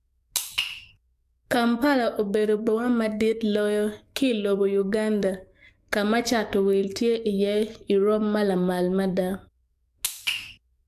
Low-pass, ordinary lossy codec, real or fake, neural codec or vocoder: 14.4 kHz; AAC, 96 kbps; fake; codec, 44.1 kHz, 7.8 kbps, DAC